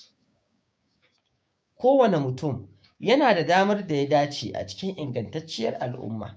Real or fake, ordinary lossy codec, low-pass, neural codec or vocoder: fake; none; none; codec, 16 kHz, 6 kbps, DAC